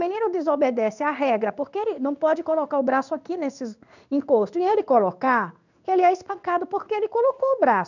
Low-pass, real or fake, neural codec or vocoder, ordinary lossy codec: 7.2 kHz; fake; codec, 16 kHz in and 24 kHz out, 1 kbps, XY-Tokenizer; none